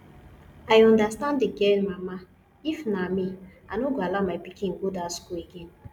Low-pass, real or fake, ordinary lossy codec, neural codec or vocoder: 19.8 kHz; real; none; none